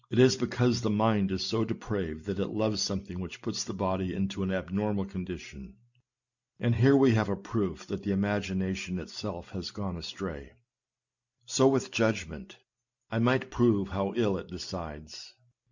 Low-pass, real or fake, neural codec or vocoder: 7.2 kHz; real; none